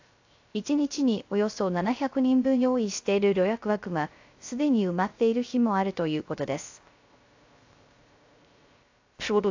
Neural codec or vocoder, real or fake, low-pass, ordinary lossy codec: codec, 16 kHz, 0.3 kbps, FocalCodec; fake; 7.2 kHz; AAC, 48 kbps